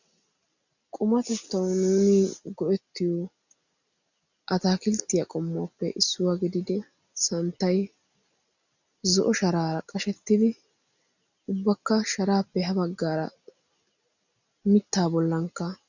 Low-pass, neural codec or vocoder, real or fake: 7.2 kHz; none; real